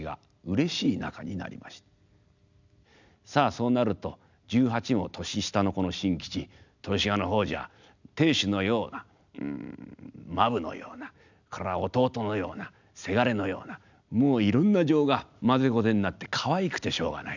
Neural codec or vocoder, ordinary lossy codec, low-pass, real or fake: none; none; 7.2 kHz; real